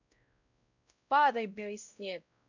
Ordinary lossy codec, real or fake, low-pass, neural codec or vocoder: none; fake; 7.2 kHz; codec, 16 kHz, 0.5 kbps, X-Codec, WavLM features, trained on Multilingual LibriSpeech